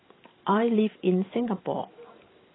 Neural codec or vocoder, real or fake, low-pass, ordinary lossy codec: vocoder, 44.1 kHz, 128 mel bands every 512 samples, BigVGAN v2; fake; 7.2 kHz; AAC, 16 kbps